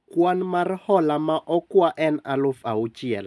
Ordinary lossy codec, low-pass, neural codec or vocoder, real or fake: none; none; none; real